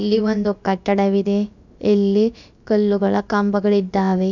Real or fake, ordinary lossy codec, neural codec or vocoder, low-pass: fake; none; codec, 16 kHz, about 1 kbps, DyCAST, with the encoder's durations; 7.2 kHz